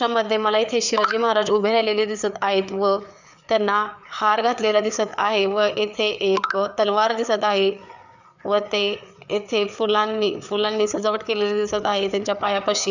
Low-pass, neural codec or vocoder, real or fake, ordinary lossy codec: 7.2 kHz; codec, 16 kHz, 4 kbps, FreqCodec, larger model; fake; none